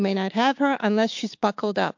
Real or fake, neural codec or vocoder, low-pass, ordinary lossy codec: fake; codec, 16 kHz, 6 kbps, DAC; 7.2 kHz; MP3, 48 kbps